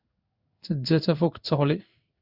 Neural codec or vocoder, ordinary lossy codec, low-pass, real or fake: codec, 16 kHz in and 24 kHz out, 1 kbps, XY-Tokenizer; Opus, 64 kbps; 5.4 kHz; fake